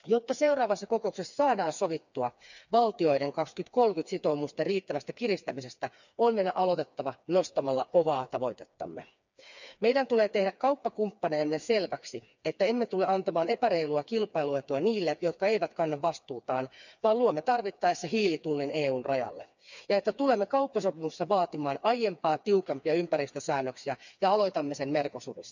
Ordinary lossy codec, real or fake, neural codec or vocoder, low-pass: none; fake; codec, 16 kHz, 4 kbps, FreqCodec, smaller model; 7.2 kHz